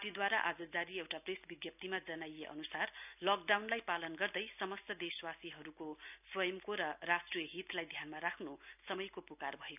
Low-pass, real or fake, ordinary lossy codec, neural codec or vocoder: 3.6 kHz; real; none; none